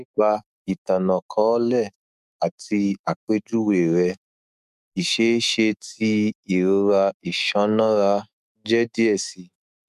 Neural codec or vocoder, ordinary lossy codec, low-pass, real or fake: autoencoder, 48 kHz, 128 numbers a frame, DAC-VAE, trained on Japanese speech; none; 14.4 kHz; fake